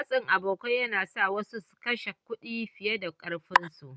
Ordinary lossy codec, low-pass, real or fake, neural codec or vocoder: none; none; real; none